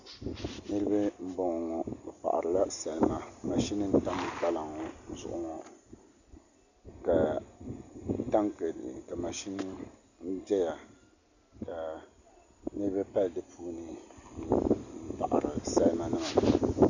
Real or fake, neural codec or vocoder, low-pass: real; none; 7.2 kHz